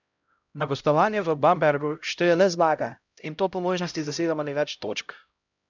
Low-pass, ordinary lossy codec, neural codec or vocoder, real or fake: 7.2 kHz; none; codec, 16 kHz, 0.5 kbps, X-Codec, HuBERT features, trained on LibriSpeech; fake